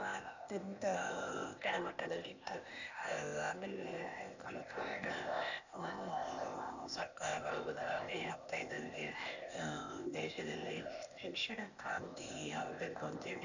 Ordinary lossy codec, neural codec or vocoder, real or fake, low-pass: none; codec, 16 kHz, 0.8 kbps, ZipCodec; fake; 7.2 kHz